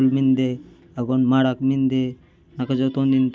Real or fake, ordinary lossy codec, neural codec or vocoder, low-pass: real; none; none; none